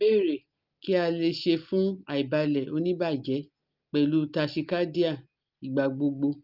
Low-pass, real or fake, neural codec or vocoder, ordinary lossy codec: 5.4 kHz; real; none; Opus, 32 kbps